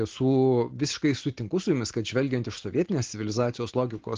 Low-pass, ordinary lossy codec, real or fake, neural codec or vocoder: 7.2 kHz; Opus, 16 kbps; real; none